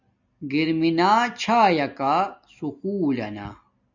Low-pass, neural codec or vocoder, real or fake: 7.2 kHz; none; real